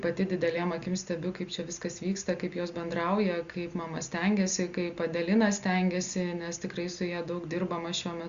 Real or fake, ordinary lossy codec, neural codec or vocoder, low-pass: real; Opus, 64 kbps; none; 7.2 kHz